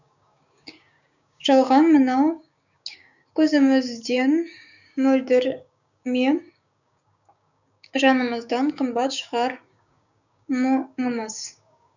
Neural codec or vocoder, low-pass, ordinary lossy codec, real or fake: codec, 16 kHz, 6 kbps, DAC; 7.2 kHz; none; fake